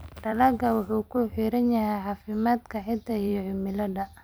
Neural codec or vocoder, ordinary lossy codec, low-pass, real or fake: vocoder, 44.1 kHz, 128 mel bands every 256 samples, BigVGAN v2; none; none; fake